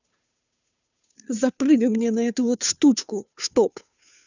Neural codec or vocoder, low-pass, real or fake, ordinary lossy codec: codec, 16 kHz, 2 kbps, FunCodec, trained on Chinese and English, 25 frames a second; 7.2 kHz; fake; none